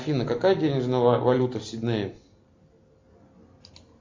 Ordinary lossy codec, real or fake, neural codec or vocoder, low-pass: MP3, 48 kbps; real; none; 7.2 kHz